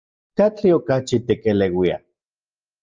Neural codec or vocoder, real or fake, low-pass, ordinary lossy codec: none; real; 7.2 kHz; Opus, 24 kbps